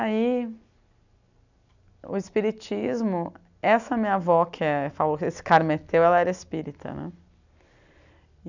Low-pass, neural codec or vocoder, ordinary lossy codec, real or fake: 7.2 kHz; none; none; real